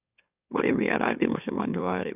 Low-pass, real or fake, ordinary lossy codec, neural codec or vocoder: 3.6 kHz; fake; none; autoencoder, 44.1 kHz, a latent of 192 numbers a frame, MeloTTS